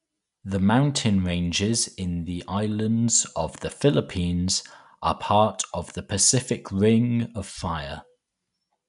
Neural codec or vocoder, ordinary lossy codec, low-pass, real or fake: none; none; 10.8 kHz; real